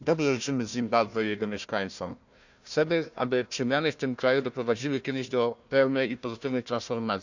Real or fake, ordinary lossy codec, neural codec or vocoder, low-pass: fake; none; codec, 16 kHz, 1 kbps, FunCodec, trained on Chinese and English, 50 frames a second; 7.2 kHz